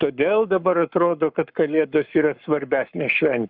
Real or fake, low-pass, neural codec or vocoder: fake; 5.4 kHz; codec, 44.1 kHz, 7.8 kbps, Pupu-Codec